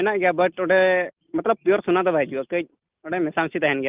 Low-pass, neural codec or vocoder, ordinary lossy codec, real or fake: 3.6 kHz; none; Opus, 24 kbps; real